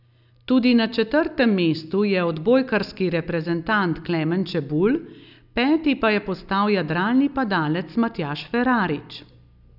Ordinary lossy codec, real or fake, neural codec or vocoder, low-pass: none; real; none; 5.4 kHz